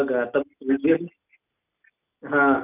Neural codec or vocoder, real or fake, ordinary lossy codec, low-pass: none; real; none; 3.6 kHz